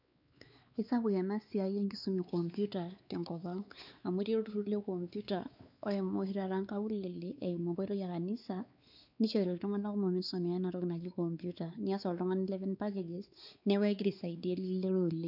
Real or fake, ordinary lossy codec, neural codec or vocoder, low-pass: fake; none; codec, 16 kHz, 4 kbps, X-Codec, WavLM features, trained on Multilingual LibriSpeech; 5.4 kHz